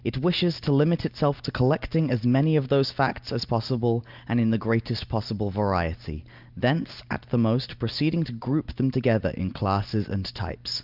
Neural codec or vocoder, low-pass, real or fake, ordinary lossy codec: none; 5.4 kHz; real; Opus, 24 kbps